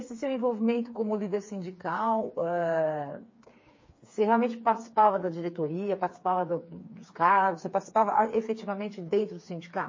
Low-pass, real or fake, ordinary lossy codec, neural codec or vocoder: 7.2 kHz; fake; MP3, 32 kbps; codec, 16 kHz, 4 kbps, FreqCodec, smaller model